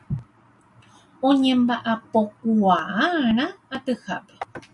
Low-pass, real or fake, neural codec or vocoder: 10.8 kHz; real; none